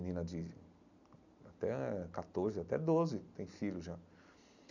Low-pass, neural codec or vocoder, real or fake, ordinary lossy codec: 7.2 kHz; none; real; none